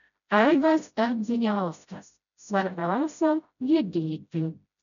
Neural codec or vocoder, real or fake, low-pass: codec, 16 kHz, 0.5 kbps, FreqCodec, smaller model; fake; 7.2 kHz